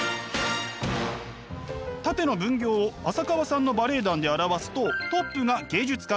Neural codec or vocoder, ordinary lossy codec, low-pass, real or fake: none; none; none; real